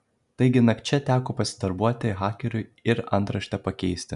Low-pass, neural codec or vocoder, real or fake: 10.8 kHz; none; real